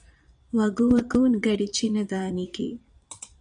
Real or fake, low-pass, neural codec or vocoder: fake; 9.9 kHz; vocoder, 22.05 kHz, 80 mel bands, Vocos